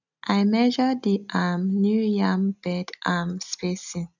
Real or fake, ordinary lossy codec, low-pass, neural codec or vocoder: real; none; 7.2 kHz; none